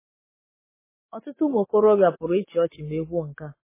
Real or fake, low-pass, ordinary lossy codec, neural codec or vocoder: fake; 3.6 kHz; MP3, 16 kbps; codec, 44.1 kHz, 7.8 kbps, Pupu-Codec